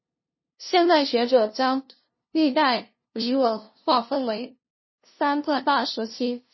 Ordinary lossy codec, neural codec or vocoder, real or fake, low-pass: MP3, 24 kbps; codec, 16 kHz, 0.5 kbps, FunCodec, trained on LibriTTS, 25 frames a second; fake; 7.2 kHz